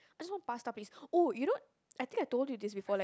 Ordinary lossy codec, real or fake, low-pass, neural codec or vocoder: none; real; none; none